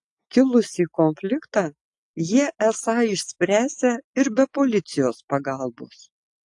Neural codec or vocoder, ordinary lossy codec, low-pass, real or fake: none; AAC, 64 kbps; 10.8 kHz; real